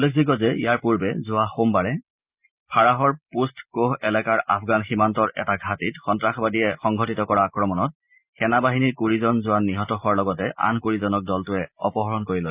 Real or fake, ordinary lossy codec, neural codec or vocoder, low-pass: real; Opus, 64 kbps; none; 3.6 kHz